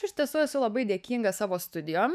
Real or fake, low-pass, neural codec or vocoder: fake; 14.4 kHz; autoencoder, 48 kHz, 128 numbers a frame, DAC-VAE, trained on Japanese speech